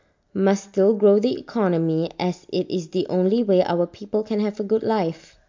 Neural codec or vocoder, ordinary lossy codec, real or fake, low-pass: none; MP3, 48 kbps; real; 7.2 kHz